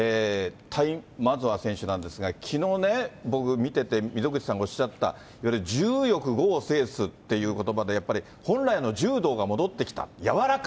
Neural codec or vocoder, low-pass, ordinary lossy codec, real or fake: none; none; none; real